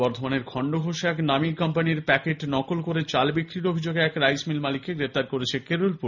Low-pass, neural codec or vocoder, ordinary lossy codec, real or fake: 7.2 kHz; none; none; real